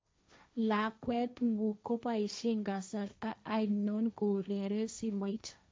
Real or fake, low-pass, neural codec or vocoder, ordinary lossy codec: fake; none; codec, 16 kHz, 1.1 kbps, Voila-Tokenizer; none